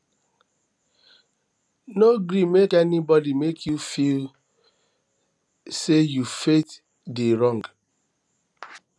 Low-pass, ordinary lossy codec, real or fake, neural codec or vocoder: none; none; real; none